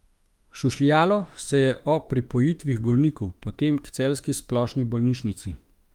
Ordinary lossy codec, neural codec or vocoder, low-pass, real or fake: Opus, 32 kbps; autoencoder, 48 kHz, 32 numbers a frame, DAC-VAE, trained on Japanese speech; 19.8 kHz; fake